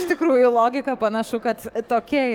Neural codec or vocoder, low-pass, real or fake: codec, 44.1 kHz, 7.8 kbps, DAC; 19.8 kHz; fake